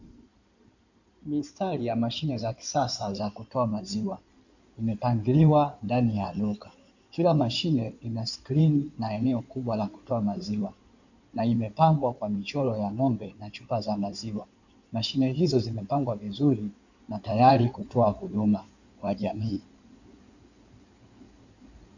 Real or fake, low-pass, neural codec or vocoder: fake; 7.2 kHz; codec, 16 kHz in and 24 kHz out, 2.2 kbps, FireRedTTS-2 codec